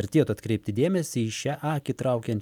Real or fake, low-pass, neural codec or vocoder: real; 19.8 kHz; none